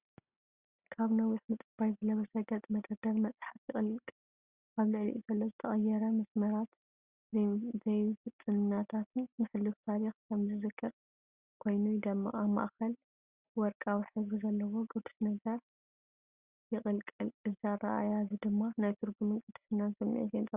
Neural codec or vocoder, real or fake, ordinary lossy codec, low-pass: none; real; Opus, 64 kbps; 3.6 kHz